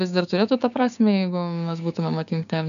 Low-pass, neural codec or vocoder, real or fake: 7.2 kHz; codec, 16 kHz, 6 kbps, DAC; fake